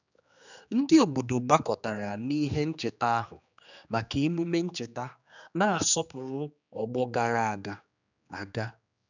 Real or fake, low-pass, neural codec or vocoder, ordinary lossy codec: fake; 7.2 kHz; codec, 16 kHz, 2 kbps, X-Codec, HuBERT features, trained on general audio; none